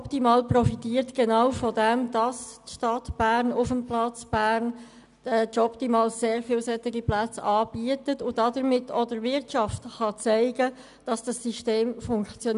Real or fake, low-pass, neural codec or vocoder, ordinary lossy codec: real; 10.8 kHz; none; none